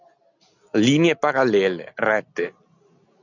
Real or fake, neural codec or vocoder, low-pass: real; none; 7.2 kHz